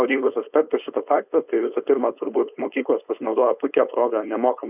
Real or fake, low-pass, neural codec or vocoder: fake; 3.6 kHz; codec, 16 kHz, 4.8 kbps, FACodec